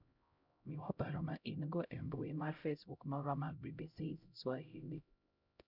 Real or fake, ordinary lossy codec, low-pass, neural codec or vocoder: fake; none; 5.4 kHz; codec, 16 kHz, 0.5 kbps, X-Codec, HuBERT features, trained on LibriSpeech